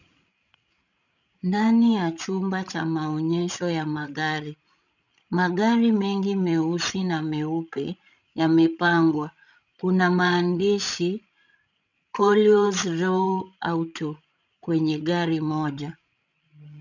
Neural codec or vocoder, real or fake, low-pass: codec, 16 kHz, 16 kbps, FreqCodec, larger model; fake; 7.2 kHz